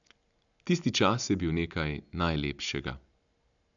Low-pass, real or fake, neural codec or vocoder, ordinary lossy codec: 7.2 kHz; real; none; none